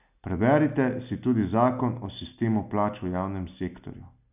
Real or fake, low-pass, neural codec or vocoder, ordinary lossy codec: real; 3.6 kHz; none; none